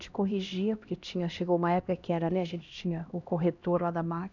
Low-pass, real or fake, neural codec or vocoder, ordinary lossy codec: 7.2 kHz; fake; codec, 16 kHz, 1 kbps, X-Codec, HuBERT features, trained on LibriSpeech; none